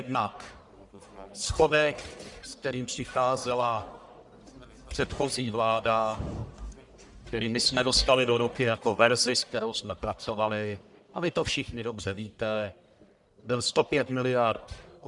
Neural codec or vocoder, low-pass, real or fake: codec, 44.1 kHz, 1.7 kbps, Pupu-Codec; 10.8 kHz; fake